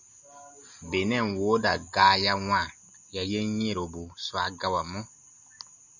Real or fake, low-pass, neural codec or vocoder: real; 7.2 kHz; none